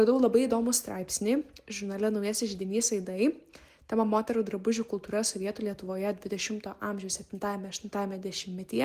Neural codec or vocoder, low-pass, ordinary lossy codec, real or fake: none; 14.4 kHz; Opus, 24 kbps; real